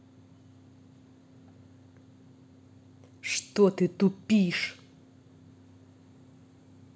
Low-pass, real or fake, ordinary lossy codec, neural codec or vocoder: none; real; none; none